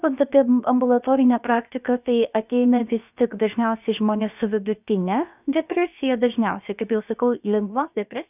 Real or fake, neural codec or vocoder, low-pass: fake; codec, 16 kHz, about 1 kbps, DyCAST, with the encoder's durations; 3.6 kHz